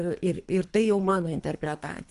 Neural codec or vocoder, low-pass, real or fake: codec, 24 kHz, 3 kbps, HILCodec; 10.8 kHz; fake